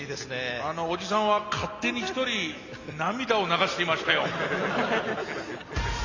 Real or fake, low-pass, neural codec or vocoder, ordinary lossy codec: real; 7.2 kHz; none; Opus, 64 kbps